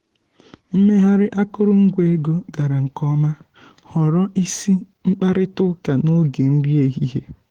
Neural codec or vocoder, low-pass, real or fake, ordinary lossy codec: autoencoder, 48 kHz, 128 numbers a frame, DAC-VAE, trained on Japanese speech; 19.8 kHz; fake; Opus, 16 kbps